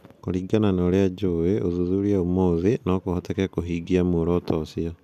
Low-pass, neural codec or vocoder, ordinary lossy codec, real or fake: 14.4 kHz; none; none; real